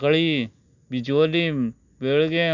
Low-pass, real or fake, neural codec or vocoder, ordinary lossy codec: 7.2 kHz; real; none; none